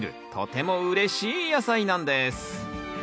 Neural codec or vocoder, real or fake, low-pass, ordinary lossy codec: none; real; none; none